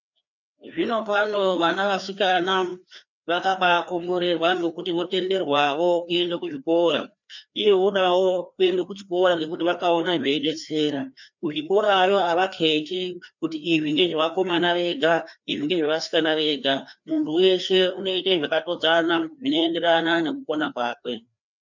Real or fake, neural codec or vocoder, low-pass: fake; codec, 16 kHz, 2 kbps, FreqCodec, larger model; 7.2 kHz